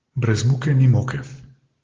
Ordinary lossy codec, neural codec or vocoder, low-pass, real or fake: Opus, 16 kbps; none; 7.2 kHz; real